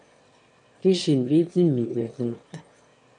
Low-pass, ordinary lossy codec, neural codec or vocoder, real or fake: 9.9 kHz; MP3, 48 kbps; autoencoder, 22.05 kHz, a latent of 192 numbers a frame, VITS, trained on one speaker; fake